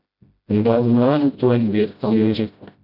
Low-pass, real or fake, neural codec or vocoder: 5.4 kHz; fake; codec, 16 kHz, 0.5 kbps, FreqCodec, smaller model